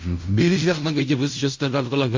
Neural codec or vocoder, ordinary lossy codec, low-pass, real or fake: codec, 16 kHz in and 24 kHz out, 0.4 kbps, LongCat-Audio-Codec, fine tuned four codebook decoder; MP3, 48 kbps; 7.2 kHz; fake